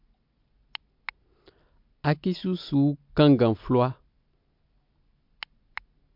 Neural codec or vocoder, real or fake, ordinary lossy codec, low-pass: none; real; MP3, 48 kbps; 5.4 kHz